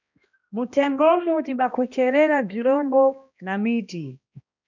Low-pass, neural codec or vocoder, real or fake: 7.2 kHz; codec, 16 kHz, 1 kbps, X-Codec, HuBERT features, trained on balanced general audio; fake